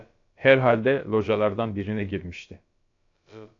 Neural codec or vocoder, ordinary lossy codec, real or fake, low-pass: codec, 16 kHz, about 1 kbps, DyCAST, with the encoder's durations; AAC, 48 kbps; fake; 7.2 kHz